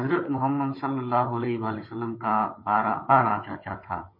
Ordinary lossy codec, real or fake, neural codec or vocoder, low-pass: MP3, 24 kbps; fake; codec, 16 kHz, 16 kbps, FunCodec, trained on Chinese and English, 50 frames a second; 5.4 kHz